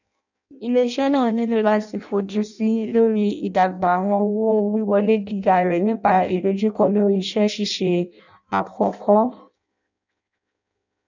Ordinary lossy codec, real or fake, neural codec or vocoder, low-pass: none; fake; codec, 16 kHz in and 24 kHz out, 0.6 kbps, FireRedTTS-2 codec; 7.2 kHz